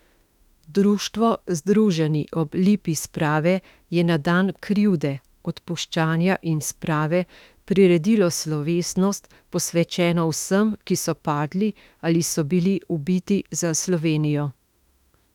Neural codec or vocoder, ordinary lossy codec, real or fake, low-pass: autoencoder, 48 kHz, 32 numbers a frame, DAC-VAE, trained on Japanese speech; none; fake; 19.8 kHz